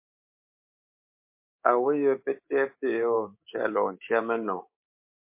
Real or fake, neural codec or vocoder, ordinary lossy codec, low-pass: fake; codec, 24 kHz, 3.1 kbps, DualCodec; MP3, 24 kbps; 3.6 kHz